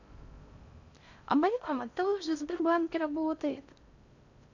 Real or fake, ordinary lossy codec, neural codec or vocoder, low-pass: fake; none; codec, 16 kHz in and 24 kHz out, 0.6 kbps, FocalCodec, streaming, 4096 codes; 7.2 kHz